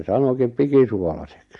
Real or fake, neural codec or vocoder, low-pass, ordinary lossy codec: real; none; 10.8 kHz; none